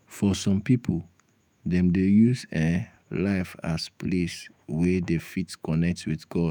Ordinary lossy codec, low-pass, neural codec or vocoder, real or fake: none; none; autoencoder, 48 kHz, 128 numbers a frame, DAC-VAE, trained on Japanese speech; fake